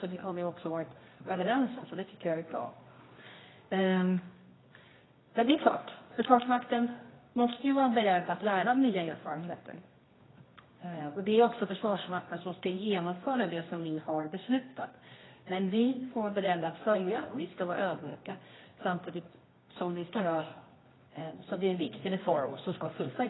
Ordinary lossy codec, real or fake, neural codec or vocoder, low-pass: AAC, 16 kbps; fake; codec, 24 kHz, 0.9 kbps, WavTokenizer, medium music audio release; 7.2 kHz